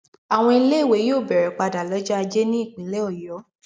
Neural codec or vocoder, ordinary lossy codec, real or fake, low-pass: none; none; real; none